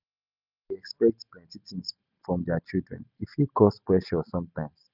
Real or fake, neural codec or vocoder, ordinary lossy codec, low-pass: real; none; none; 5.4 kHz